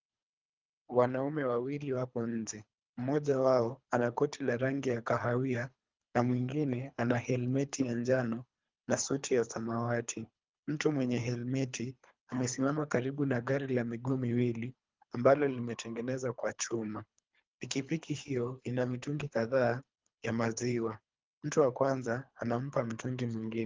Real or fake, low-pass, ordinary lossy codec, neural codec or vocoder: fake; 7.2 kHz; Opus, 24 kbps; codec, 24 kHz, 3 kbps, HILCodec